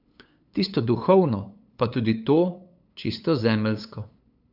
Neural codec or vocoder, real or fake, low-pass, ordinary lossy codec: codec, 16 kHz, 8 kbps, FunCodec, trained on LibriTTS, 25 frames a second; fake; 5.4 kHz; AAC, 48 kbps